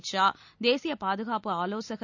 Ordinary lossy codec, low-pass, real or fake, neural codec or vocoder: none; 7.2 kHz; real; none